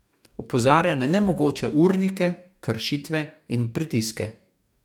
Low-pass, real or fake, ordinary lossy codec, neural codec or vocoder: 19.8 kHz; fake; none; codec, 44.1 kHz, 2.6 kbps, DAC